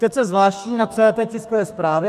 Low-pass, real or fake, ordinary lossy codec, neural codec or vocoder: 14.4 kHz; fake; MP3, 96 kbps; codec, 32 kHz, 1.9 kbps, SNAC